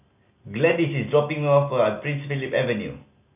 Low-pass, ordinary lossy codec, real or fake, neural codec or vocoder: 3.6 kHz; none; real; none